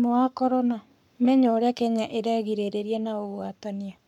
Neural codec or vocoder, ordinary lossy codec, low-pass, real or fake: codec, 44.1 kHz, 7.8 kbps, Pupu-Codec; none; 19.8 kHz; fake